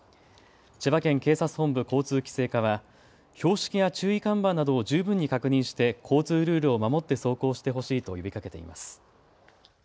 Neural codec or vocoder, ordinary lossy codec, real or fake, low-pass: none; none; real; none